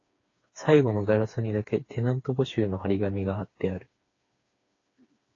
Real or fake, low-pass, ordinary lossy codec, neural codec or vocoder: fake; 7.2 kHz; AAC, 32 kbps; codec, 16 kHz, 4 kbps, FreqCodec, smaller model